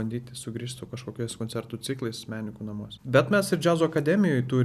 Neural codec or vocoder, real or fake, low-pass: none; real; 14.4 kHz